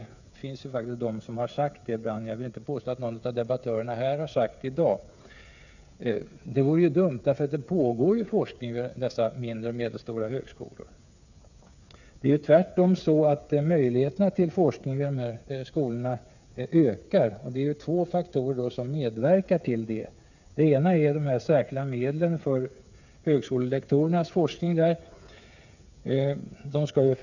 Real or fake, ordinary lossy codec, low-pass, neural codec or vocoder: fake; none; 7.2 kHz; codec, 16 kHz, 8 kbps, FreqCodec, smaller model